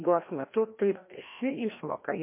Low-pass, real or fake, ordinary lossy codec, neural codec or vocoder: 3.6 kHz; fake; MP3, 24 kbps; codec, 16 kHz, 1 kbps, FreqCodec, larger model